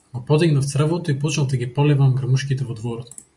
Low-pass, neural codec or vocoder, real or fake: 10.8 kHz; none; real